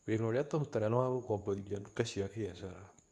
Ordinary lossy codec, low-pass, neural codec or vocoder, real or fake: none; none; codec, 24 kHz, 0.9 kbps, WavTokenizer, medium speech release version 2; fake